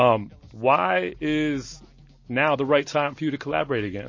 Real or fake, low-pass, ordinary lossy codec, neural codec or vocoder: real; 7.2 kHz; MP3, 32 kbps; none